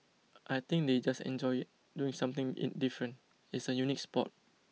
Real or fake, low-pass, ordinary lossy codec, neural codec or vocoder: real; none; none; none